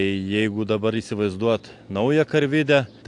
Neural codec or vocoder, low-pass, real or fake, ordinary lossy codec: none; 10.8 kHz; real; AAC, 64 kbps